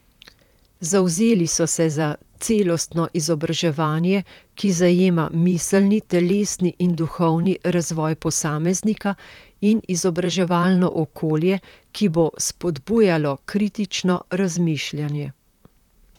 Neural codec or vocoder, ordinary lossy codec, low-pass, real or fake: vocoder, 44.1 kHz, 128 mel bands, Pupu-Vocoder; none; 19.8 kHz; fake